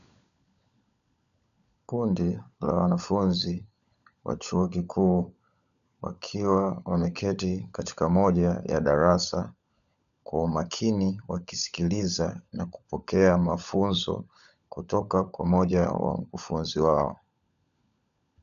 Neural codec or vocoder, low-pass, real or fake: codec, 16 kHz, 16 kbps, FunCodec, trained on LibriTTS, 50 frames a second; 7.2 kHz; fake